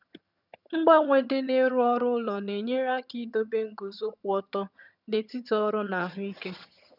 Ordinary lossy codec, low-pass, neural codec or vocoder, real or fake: none; 5.4 kHz; vocoder, 22.05 kHz, 80 mel bands, HiFi-GAN; fake